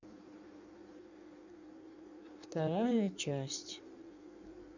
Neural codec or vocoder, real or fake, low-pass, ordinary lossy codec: codec, 16 kHz in and 24 kHz out, 1.1 kbps, FireRedTTS-2 codec; fake; 7.2 kHz; none